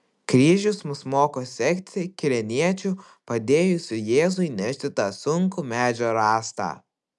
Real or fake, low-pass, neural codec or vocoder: real; 10.8 kHz; none